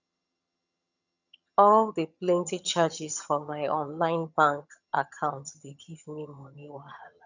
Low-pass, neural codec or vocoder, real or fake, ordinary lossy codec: 7.2 kHz; vocoder, 22.05 kHz, 80 mel bands, HiFi-GAN; fake; none